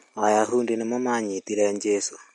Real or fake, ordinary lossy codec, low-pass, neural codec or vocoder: real; MP3, 48 kbps; 19.8 kHz; none